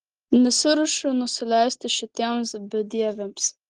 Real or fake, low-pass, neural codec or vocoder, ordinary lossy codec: real; 10.8 kHz; none; Opus, 16 kbps